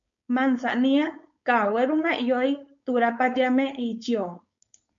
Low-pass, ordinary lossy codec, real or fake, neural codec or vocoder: 7.2 kHz; MP3, 48 kbps; fake; codec, 16 kHz, 4.8 kbps, FACodec